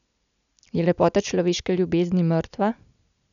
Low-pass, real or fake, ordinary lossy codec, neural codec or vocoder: 7.2 kHz; real; none; none